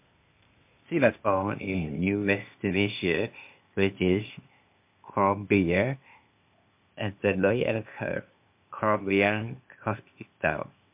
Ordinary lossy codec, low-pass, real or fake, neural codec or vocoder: MP3, 32 kbps; 3.6 kHz; fake; codec, 16 kHz, 0.8 kbps, ZipCodec